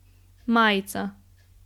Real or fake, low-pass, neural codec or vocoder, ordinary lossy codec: real; 19.8 kHz; none; MP3, 96 kbps